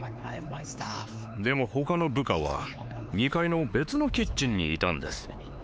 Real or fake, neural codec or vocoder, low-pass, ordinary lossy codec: fake; codec, 16 kHz, 4 kbps, X-Codec, HuBERT features, trained on LibriSpeech; none; none